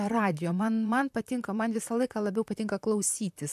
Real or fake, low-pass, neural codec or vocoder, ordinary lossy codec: fake; 14.4 kHz; vocoder, 44.1 kHz, 128 mel bands, Pupu-Vocoder; AAC, 96 kbps